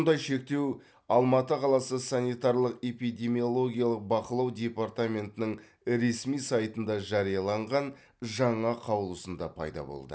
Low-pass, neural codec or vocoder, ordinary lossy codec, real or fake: none; none; none; real